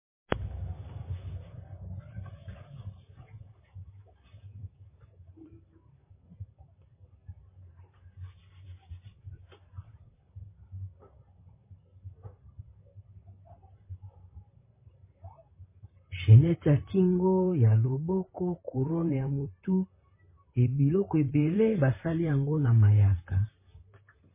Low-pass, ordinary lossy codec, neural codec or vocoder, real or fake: 3.6 kHz; MP3, 16 kbps; vocoder, 44.1 kHz, 128 mel bands, Pupu-Vocoder; fake